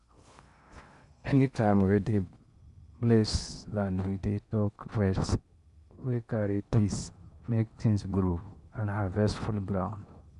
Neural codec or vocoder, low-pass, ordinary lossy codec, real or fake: codec, 16 kHz in and 24 kHz out, 0.8 kbps, FocalCodec, streaming, 65536 codes; 10.8 kHz; none; fake